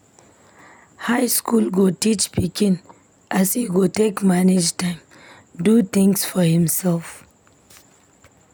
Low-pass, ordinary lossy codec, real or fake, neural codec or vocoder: none; none; real; none